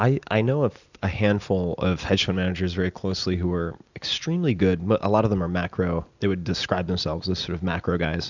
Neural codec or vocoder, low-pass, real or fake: none; 7.2 kHz; real